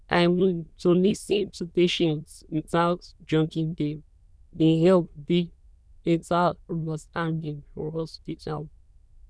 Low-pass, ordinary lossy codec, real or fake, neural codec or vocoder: none; none; fake; autoencoder, 22.05 kHz, a latent of 192 numbers a frame, VITS, trained on many speakers